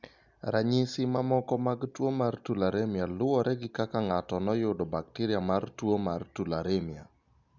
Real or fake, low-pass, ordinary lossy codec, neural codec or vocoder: real; 7.2 kHz; none; none